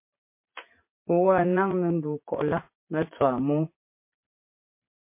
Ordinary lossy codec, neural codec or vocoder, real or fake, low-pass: MP3, 24 kbps; vocoder, 44.1 kHz, 80 mel bands, Vocos; fake; 3.6 kHz